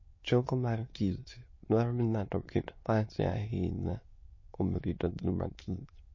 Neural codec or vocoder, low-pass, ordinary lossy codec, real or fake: autoencoder, 22.05 kHz, a latent of 192 numbers a frame, VITS, trained on many speakers; 7.2 kHz; MP3, 32 kbps; fake